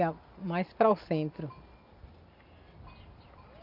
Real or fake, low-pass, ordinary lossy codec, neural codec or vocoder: real; 5.4 kHz; none; none